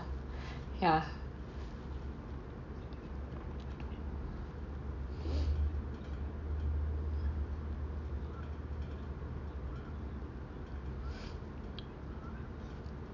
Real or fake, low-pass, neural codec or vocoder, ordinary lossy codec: real; 7.2 kHz; none; none